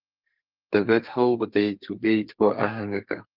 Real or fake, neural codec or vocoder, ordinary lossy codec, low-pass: fake; codec, 32 kHz, 1.9 kbps, SNAC; Opus, 32 kbps; 5.4 kHz